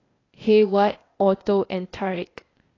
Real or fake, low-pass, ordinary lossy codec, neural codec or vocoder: fake; 7.2 kHz; AAC, 32 kbps; codec, 16 kHz, 0.8 kbps, ZipCodec